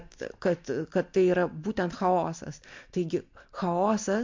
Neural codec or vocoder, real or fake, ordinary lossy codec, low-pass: none; real; MP3, 48 kbps; 7.2 kHz